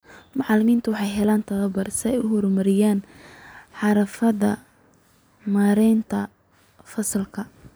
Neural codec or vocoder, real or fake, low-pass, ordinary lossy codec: none; real; none; none